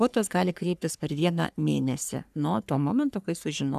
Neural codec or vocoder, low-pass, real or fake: codec, 44.1 kHz, 3.4 kbps, Pupu-Codec; 14.4 kHz; fake